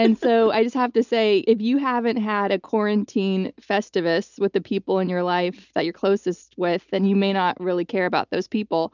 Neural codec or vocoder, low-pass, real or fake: none; 7.2 kHz; real